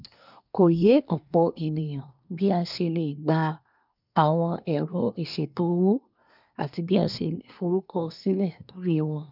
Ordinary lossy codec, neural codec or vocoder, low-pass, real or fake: none; codec, 24 kHz, 1 kbps, SNAC; 5.4 kHz; fake